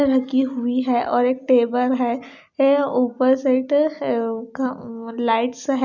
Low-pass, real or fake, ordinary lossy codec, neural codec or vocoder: 7.2 kHz; real; none; none